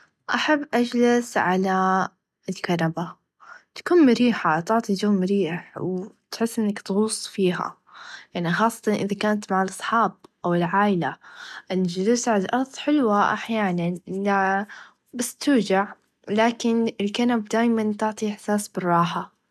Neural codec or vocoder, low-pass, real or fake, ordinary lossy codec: none; none; real; none